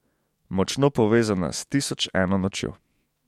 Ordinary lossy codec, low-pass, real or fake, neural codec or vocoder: MP3, 64 kbps; 19.8 kHz; fake; autoencoder, 48 kHz, 128 numbers a frame, DAC-VAE, trained on Japanese speech